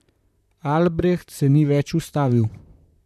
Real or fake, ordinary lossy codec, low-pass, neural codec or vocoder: fake; none; 14.4 kHz; vocoder, 44.1 kHz, 128 mel bands, Pupu-Vocoder